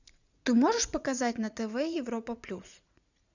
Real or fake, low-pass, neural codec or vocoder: fake; 7.2 kHz; vocoder, 44.1 kHz, 80 mel bands, Vocos